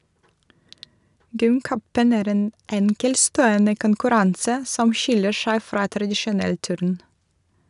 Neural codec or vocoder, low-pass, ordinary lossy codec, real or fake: none; 10.8 kHz; none; real